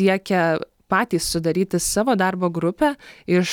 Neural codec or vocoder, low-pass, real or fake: none; 19.8 kHz; real